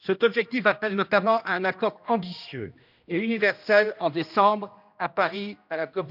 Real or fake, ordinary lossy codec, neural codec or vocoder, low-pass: fake; none; codec, 16 kHz, 1 kbps, X-Codec, HuBERT features, trained on general audio; 5.4 kHz